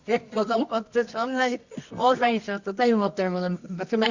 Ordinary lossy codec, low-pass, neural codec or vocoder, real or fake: Opus, 64 kbps; 7.2 kHz; codec, 24 kHz, 0.9 kbps, WavTokenizer, medium music audio release; fake